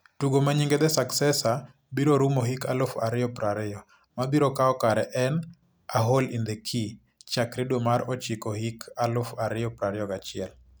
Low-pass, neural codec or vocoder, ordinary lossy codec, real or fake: none; none; none; real